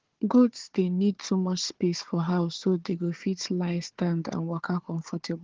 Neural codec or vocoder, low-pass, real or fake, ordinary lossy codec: codec, 16 kHz, 4 kbps, FunCodec, trained on Chinese and English, 50 frames a second; 7.2 kHz; fake; Opus, 16 kbps